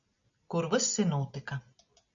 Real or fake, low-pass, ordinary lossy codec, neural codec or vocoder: real; 7.2 kHz; MP3, 96 kbps; none